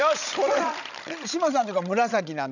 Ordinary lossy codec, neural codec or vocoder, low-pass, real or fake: none; codec, 16 kHz, 16 kbps, FreqCodec, larger model; 7.2 kHz; fake